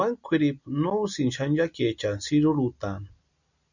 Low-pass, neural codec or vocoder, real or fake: 7.2 kHz; none; real